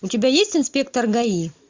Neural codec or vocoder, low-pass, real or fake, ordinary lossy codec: none; 7.2 kHz; real; MP3, 64 kbps